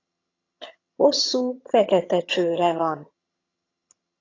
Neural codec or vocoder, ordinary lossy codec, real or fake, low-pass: vocoder, 22.05 kHz, 80 mel bands, HiFi-GAN; AAC, 32 kbps; fake; 7.2 kHz